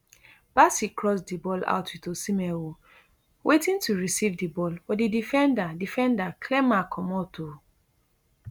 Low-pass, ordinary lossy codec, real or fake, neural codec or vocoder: none; none; real; none